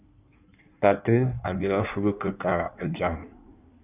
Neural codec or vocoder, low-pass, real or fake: codec, 16 kHz in and 24 kHz out, 1.1 kbps, FireRedTTS-2 codec; 3.6 kHz; fake